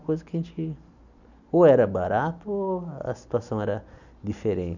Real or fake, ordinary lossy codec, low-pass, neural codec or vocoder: real; none; 7.2 kHz; none